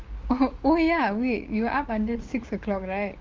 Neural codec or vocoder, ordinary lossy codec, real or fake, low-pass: codec, 16 kHz, 6 kbps, DAC; Opus, 32 kbps; fake; 7.2 kHz